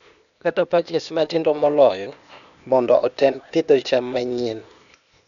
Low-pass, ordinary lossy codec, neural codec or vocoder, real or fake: 7.2 kHz; none; codec, 16 kHz, 0.8 kbps, ZipCodec; fake